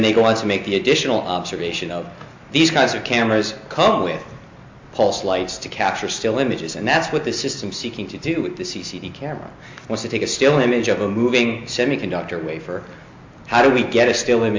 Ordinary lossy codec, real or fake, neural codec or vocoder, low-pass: MP3, 48 kbps; real; none; 7.2 kHz